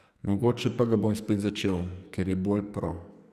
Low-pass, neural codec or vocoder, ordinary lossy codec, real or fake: 14.4 kHz; codec, 44.1 kHz, 2.6 kbps, SNAC; none; fake